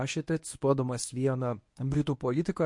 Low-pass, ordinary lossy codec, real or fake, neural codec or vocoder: 10.8 kHz; MP3, 48 kbps; fake; codec, 24 kHz, 0.9 kbps, WavTokenizer, medium speech release version 1